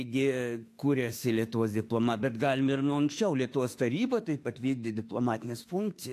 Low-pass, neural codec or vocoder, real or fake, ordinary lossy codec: 14.4 kHz; autoencoder, 48 kHz, 32 numbers a frame, DAC-VAE, trained on Japanese speech; fake; AAC, 64 kbps